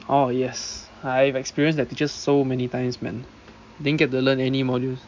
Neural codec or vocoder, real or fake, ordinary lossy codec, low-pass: none; real; MP3, 64 kbps; 7.2 kHz